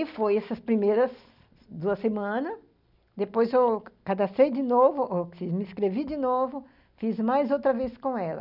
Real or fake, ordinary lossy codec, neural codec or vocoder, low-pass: real; none; none; 5.4 kHz